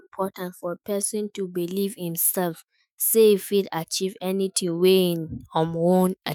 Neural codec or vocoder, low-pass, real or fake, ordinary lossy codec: autoencoder, 48 kHz, 128 numbers a frame, DAC-VAE, trained on Japanese speech; none; fake; none